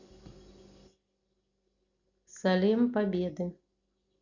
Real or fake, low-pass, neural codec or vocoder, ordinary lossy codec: real; 7.2 kHz; none; Opus, 64 kbps